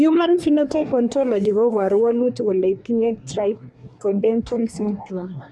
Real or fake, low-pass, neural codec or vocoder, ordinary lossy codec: fake; none; codec, 24 kHz, 1 kbps, SNAC; none